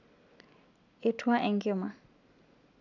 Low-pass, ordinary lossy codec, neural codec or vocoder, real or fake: 7.2 kHz; none; none; real